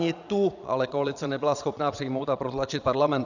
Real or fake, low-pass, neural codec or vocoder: fake; 7.2 kHz; vocoder, 22.05 kHz, 80 mel bands, Vocos